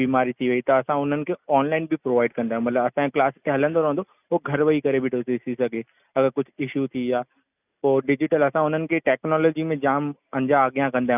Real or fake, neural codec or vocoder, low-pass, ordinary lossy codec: real; none; 3.6 kHz; none